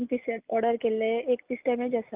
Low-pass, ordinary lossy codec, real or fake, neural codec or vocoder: 3.6 kHz; Opus, 24 kbps; real; none